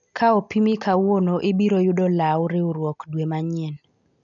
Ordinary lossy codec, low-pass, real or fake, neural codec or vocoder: none; 7.2 kHz; real; none